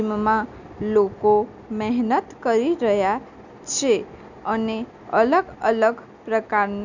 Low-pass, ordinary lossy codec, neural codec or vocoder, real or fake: 7.2 kHz; none; none; real